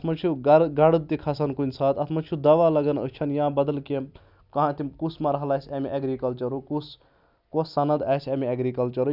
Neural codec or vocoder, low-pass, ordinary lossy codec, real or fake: none; 5.4 kHz; none; real